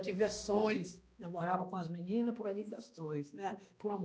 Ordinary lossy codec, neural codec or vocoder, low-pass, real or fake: none; codec, 16 kHz, 1 kbps, X-Codec, HuBERT features, trained on balanced general audio; none; fake